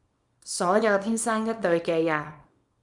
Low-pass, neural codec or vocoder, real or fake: 10.8 kHz; codec, 24 kHz, 0.9 kbps, WavTokenizer, small release; fake